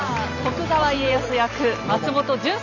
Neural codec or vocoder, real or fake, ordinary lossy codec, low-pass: none; real; none; 7.2 kHz